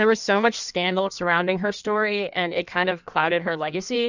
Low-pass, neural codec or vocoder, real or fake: 7.2 kHz; codec, 16 kHz in and 24 kHz out, 1.1 kbps, FireRedTTS-2 codec; fake